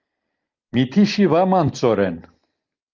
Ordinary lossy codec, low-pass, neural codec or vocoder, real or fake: Opus, 24 kbps; 7.2 kHz; none; real